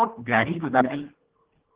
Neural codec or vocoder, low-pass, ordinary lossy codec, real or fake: codec, 24 kHz, 1.5 kbps, HILCodec; 3.6 kHz; Opus, 16 kbps; fake